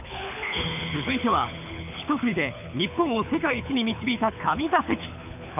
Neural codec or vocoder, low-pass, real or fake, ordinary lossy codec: codec, 24 kHz, 6 kbps, HILCodec; 3.6 kHz; fake; none